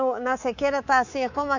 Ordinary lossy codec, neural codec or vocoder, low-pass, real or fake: none; codec, 24 kHz, 3.1 kbps, DualCodec; 7.2 kHz; fake